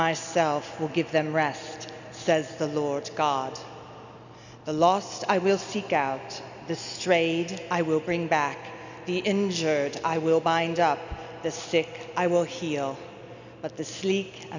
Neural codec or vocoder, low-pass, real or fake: none; 7.2 kHz; real